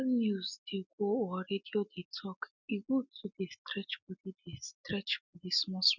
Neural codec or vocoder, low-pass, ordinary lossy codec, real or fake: none; 7.2 kHz; none; real